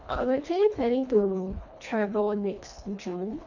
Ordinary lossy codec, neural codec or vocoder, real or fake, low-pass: none; codec, 24 kHz, 1.5 kbps, HILCodec; fake; 7.2 kHz